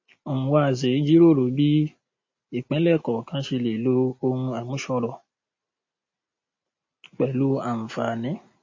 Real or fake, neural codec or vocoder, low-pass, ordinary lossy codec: real; none; 7.2 kHz; MP3, 32 kbps